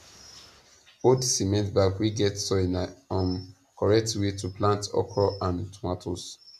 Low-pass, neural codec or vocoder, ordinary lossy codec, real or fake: 14.4 kHz; none; none; real